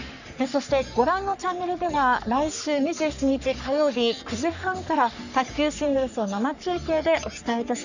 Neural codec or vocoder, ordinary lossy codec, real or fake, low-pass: codec, 44.1 kHz, 3.4 kbps, Pupu-Codec; none; fake; 7.2 kHz